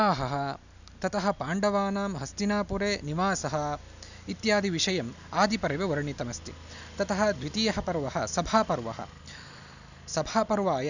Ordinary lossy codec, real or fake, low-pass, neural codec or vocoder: none; real; 7.2 kHz; none